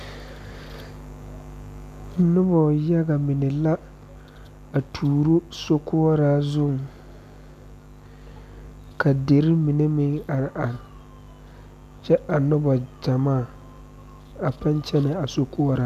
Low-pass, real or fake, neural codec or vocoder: 14.4 kHz; real; none